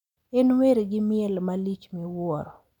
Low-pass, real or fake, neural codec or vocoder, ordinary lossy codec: 19.8 kHz; real; none; none